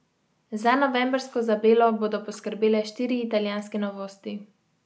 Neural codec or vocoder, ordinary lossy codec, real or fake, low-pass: none; none; real; none